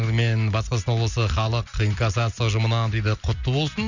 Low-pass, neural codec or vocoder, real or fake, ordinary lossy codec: 7.2 kHz; none; real; none